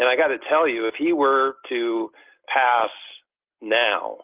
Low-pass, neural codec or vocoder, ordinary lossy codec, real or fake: 3.6 kHz; none; Opus, 32 kbps; real